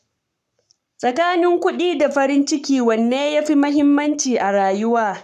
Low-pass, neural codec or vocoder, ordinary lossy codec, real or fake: 14.4 kHz; codec, 44.1 kHz, 7.8 kbps, Pupu-Codec; none; fake